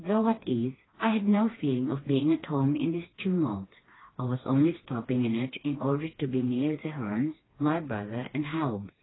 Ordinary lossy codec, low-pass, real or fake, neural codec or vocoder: AAC, 16 kbps; 7.2 kHz; fake; codec, 16 kHz, 2 kbps, FreqCodec, smaller model